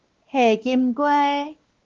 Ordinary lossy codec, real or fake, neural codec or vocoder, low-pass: Opus, 16 kbps; fake; codec, 16 kHz, 2 kbps, X-Codec, WavLM features, trained on Multilingual LibriSpeech; 7.2 kHz